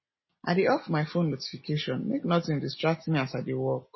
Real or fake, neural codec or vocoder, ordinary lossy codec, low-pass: real; none; MP3, 24 kbps; 7.2 kHz